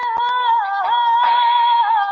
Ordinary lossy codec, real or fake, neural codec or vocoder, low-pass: AAC, 48 kbps; real; none; 7.2 kHz